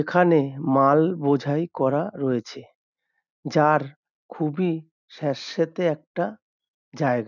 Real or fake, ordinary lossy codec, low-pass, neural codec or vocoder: real; none; 7.2 kHz; none